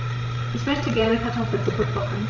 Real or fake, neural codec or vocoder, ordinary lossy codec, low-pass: fake; codec, 16 kHz, 16 kbps, FreqCodec, larger model; MP3, 64 kbps; 7.2 kHz